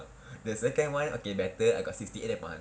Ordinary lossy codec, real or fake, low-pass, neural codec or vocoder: none; real; none; none